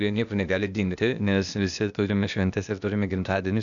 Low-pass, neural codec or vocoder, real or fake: 7.2 kHz; codec, 16 kHz, 0.8 kbps, ZipCodec; fake